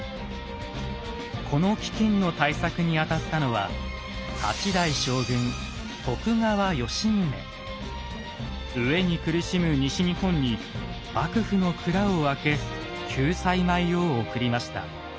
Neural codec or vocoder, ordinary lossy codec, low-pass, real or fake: none; none; none; real